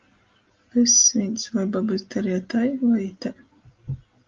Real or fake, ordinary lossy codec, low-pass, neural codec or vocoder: real; Opus, 32 kbps; 7.2 kHz; none